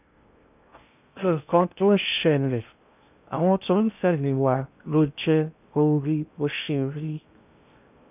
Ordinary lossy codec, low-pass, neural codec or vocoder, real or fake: none; 3.6 kHz; codec, 16 kHz in and 24 kHz out, 0.6 kbps, FocalCodec, streaming, 2048 codes; fake